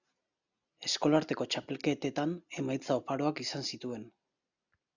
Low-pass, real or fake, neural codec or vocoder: 7.2 kHz; real; none